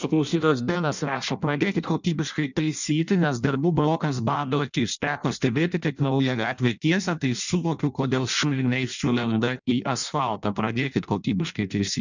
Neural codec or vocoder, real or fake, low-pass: codec, 16 kHz in and 24 kHz out, 0.6 kbps, FireRedTTS-2 codec; fake; 7.2 kHz